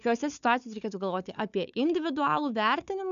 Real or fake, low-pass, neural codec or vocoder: fake; 7.2 kHz; codec, 16 kHz, 4 kbps, FunCodec, trained on Chinese and English, 50 frames a second